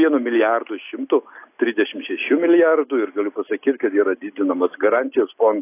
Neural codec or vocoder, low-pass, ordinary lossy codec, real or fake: none; 3.6 kHz; AAC, 24 kbps; real